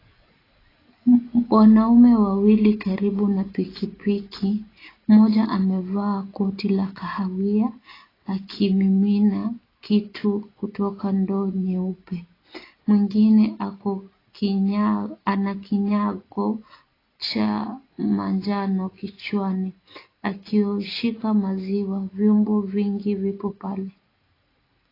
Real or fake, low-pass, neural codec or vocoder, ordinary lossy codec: real; 5.4 kHz; none; AAC, 24 kbps